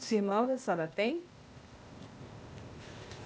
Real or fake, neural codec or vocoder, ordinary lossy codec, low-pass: fake; codec, 16 kHz, 0.8 kbps, ZipCodec; none; none